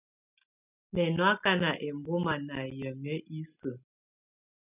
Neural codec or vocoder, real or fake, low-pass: none; real; 3.6 kHz